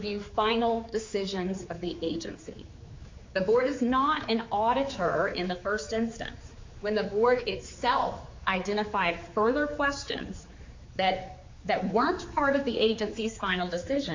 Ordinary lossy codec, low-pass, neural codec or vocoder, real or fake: MP3, 48 kbps; 7.2 kHz; codec, 16 kHz, 4 kbps, X-Codec, HuBERT features, trained on general audio; fake